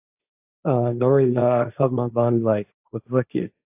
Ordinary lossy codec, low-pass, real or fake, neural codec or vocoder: AAC, 32 kbps; 3.6 kHz; fake; codec, 16 kHz, 1.1 kbps, Voila-Tokenizer